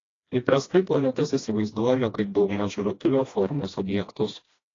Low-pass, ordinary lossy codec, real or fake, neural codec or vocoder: 7.2 kHz; AAC, 32 kbps; fake; codec, 16 kHz, 1 kbps, FreqCodec, smaller model